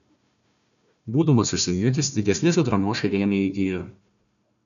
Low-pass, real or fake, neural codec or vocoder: 7.2 kHz; fake; codec, 16 kHz, 1 kbps, FunCodec, trained on Chinese and English, 50 frames a second